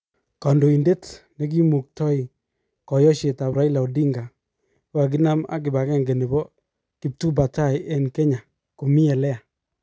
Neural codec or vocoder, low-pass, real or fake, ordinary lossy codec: none; none; real; none